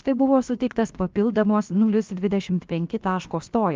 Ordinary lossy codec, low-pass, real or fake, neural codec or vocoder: Opus, 32 kbps; 7.2 kHz; fake; codec, 16 kHz, about 1 kbps, DyCAST, with the encoder's durations